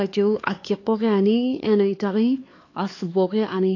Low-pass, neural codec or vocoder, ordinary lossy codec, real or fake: 7.2 kHz; codec, 16 kHz, 4 kbps, X-Codec, HuBERT features, trained on LibriSpeech; AAC, 32 kbps; fake